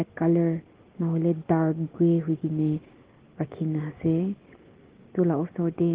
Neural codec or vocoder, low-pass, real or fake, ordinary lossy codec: none; 3.6 kHz; real; Opus, 16 kbps